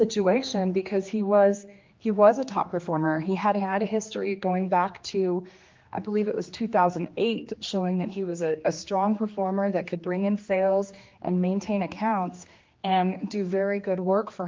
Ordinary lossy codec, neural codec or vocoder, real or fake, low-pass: Opus, 32 kbps; codec, 16 kHz, 2 kbps, X-Codec, HuBERT features, trained on general audio; fake; 7.2 kHz